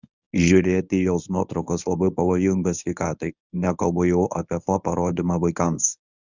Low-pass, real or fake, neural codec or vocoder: 7.2 kHz; fake; codec, 24 kHz, 0.9 kbps, WavTokenizer, medium speech release version 1